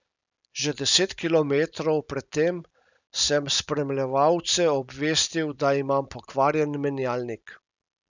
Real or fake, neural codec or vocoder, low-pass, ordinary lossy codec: real; none; 7.2 kHz; none